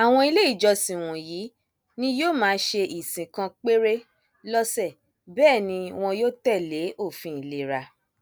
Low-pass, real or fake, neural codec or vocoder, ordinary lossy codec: none; real; none; none